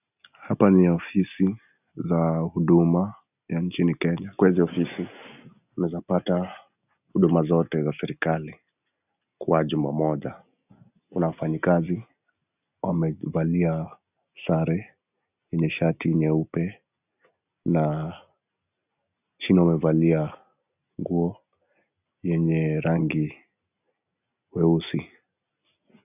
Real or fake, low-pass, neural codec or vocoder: real; 3.6 kHz; none